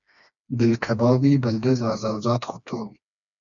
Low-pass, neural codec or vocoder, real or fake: 7.2 kHz; codec, 16 kHz, 2 kbps, FreqCodec, smaller model; fake